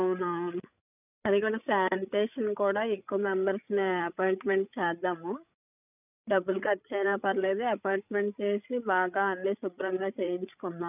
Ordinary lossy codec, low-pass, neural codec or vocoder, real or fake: none; 3.6 kHz; codec, 16 kHz, 8 kbps, FreqCodec, larger model; fake